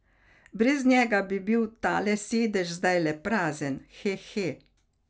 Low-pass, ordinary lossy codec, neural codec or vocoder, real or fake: none; none; none; real